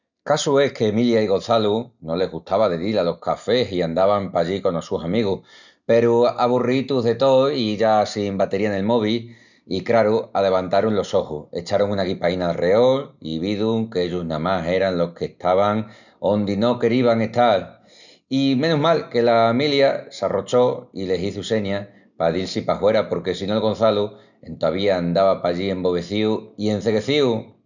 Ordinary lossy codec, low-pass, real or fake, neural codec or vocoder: none; 7.2 kHz; real; none